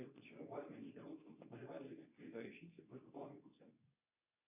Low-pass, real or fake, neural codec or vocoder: 3.6 kHz; fake; codec, 24 kHz, 0.9 kbps, WavTokenizer, medium speech release version 2